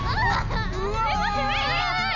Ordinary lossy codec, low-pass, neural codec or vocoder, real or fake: none; 7.2 kHz; none; real